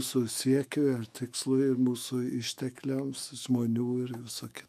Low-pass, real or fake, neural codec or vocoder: 14.4 kHz; fake; autoencoder, 48 kHz, 128 numbers a frame, DAC-VAE, trained on Japanese speech